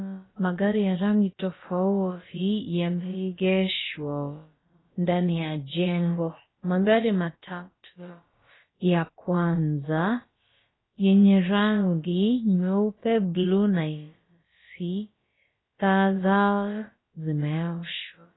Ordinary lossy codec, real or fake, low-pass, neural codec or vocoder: AAC, 16 kbps; fake; 7.2 kHz; codec, 16 kHz, about 1 kbps, DyCAST, with the encoder's durations